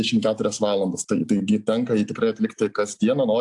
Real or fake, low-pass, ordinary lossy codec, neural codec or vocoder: real; 10.8 kHz; AAC, 64 kbps; none